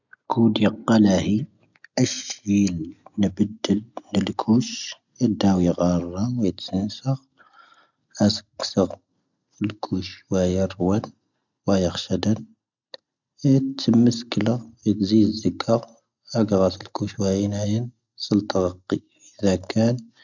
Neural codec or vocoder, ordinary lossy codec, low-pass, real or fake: none; none; 7.2 kHz; real